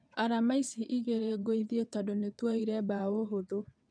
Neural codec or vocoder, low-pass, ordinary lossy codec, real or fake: vocoder, 22.05 kHz, 80 mel bands, WaveNeXt; 9.9 kHz; none; fake